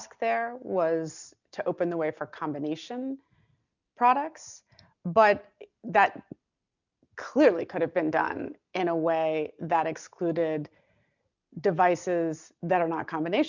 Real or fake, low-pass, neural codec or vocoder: real; 7.2 kHz; none